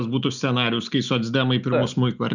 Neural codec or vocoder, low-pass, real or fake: none; 7.2 kHz; real